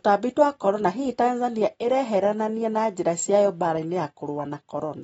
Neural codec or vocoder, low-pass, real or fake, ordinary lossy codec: none; 19.8 kHz; real; AAC, 24 kbps